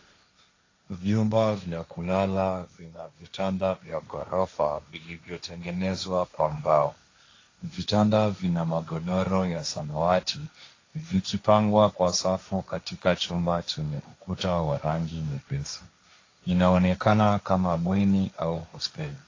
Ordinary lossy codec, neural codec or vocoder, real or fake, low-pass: AAC, 32 kbps; codec, 16 kHz, 1.1 kbps, Voila-Tokenizer; fake; 7.2 kHz